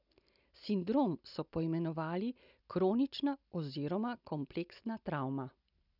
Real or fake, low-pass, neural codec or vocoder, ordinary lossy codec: real; 5.4 kHz; none; none